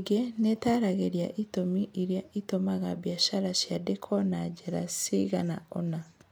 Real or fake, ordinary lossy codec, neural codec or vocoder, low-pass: real; none; none; none